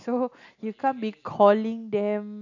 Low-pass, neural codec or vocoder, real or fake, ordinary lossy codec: 7.2 kHz; none; real; none